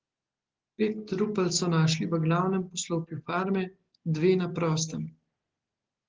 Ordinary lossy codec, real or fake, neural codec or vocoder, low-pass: Opus, 16 kbps; real; none; 7.2 kHz